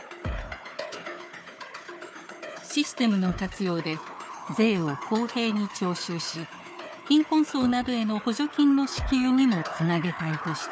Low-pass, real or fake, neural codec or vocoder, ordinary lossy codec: none; fake; codec, 16 kHz, 4 kbps, FunCodec, trained on Chinese and English, 50 frames a second; none